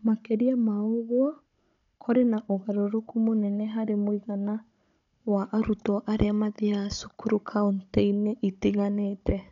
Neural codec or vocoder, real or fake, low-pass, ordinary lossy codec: codec, 16 kHz, 16 kbps, FunCodec, trained on Chinese and English, 50 frames a second; fake; 7.2 kHz; none